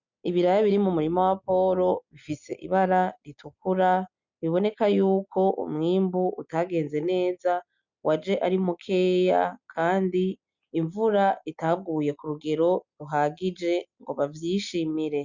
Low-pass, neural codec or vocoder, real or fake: 7.2 kHz; none; real